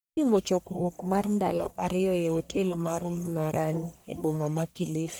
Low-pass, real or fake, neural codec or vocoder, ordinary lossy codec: none; fake; codec, 44.1 kHz, 1.7 kbps, Pupu-Codec; none